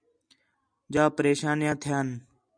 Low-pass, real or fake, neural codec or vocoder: 9.9 kHz; real; none